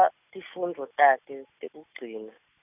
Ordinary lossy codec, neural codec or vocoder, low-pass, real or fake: none; none; 3.6 kHz; real